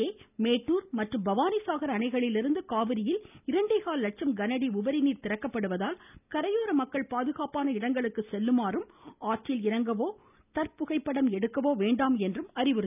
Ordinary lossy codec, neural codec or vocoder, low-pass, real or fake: none; none; 3.6 kHz; real